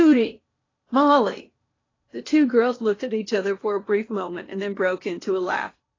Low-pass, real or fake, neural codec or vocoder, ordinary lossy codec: 7.2 kHz; fake; codec, 16 kHz in and 24 kHz out, 0.8 kbps, FocalCodec, streaming, 65536 codes; AAC, 32 kbps